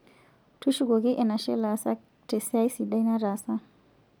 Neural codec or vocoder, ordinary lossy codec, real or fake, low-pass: none; none; real; none